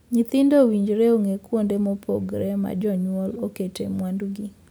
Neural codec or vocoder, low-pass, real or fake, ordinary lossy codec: none; none; real; none